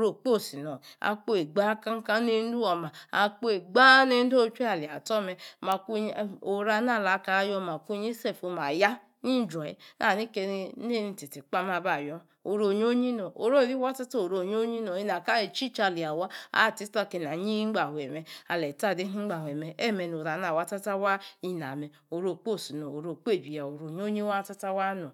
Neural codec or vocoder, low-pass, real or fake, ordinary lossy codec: none; 19.8 kHz; real; none